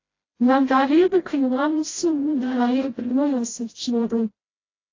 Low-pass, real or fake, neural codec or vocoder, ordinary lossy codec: 7.2 kHz; fake; codec, 16 kHz, 0.5 kbps, FreqCodec, smaller model; AAC, 32 kbps